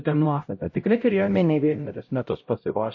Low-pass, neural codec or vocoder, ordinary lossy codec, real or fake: 7.2 kHz; codec, 16 kHz, 0.5 kbps, X-Codec, HuBERT features, trained on LibriSpeech; MP3, 32 kbps; fake